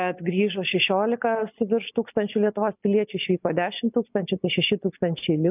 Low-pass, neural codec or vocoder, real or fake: 3.6 kHz; none; real